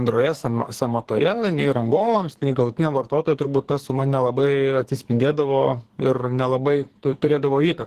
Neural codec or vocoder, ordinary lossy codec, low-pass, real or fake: codec, 44.1 kHz, 2.6 kbps, SNAC; Opus, 16 kbps; 14.4 kHz; fake